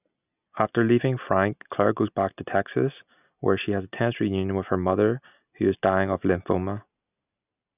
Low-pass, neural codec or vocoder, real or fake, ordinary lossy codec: 3.6 kHz; none; real; none